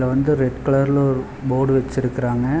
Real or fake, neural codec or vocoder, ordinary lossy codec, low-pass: real; none; none; none